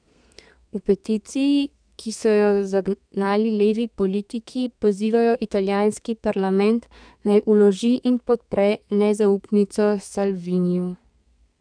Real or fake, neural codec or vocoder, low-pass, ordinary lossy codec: fake; codec, 32 kHz, 1.9 kbps, SNAC; 9.9 kHz; none